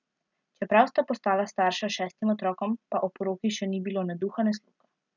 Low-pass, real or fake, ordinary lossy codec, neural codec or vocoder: 7.2 kHz; real; none; none